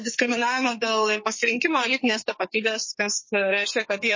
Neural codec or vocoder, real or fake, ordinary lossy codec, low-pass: codec, 44.1 kHz, 2.6 kbps, SNAC; fake; MP3, 32 kbps; 7.2 kHz